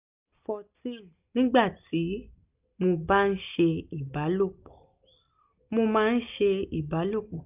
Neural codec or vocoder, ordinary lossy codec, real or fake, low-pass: none; none; real; 3.6 kHz